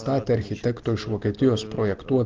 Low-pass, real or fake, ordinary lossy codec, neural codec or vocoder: 7.2 kHz; real; Opus, 16 kbps; none